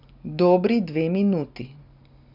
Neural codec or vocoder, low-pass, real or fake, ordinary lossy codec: none; 5.4 kHz; real; none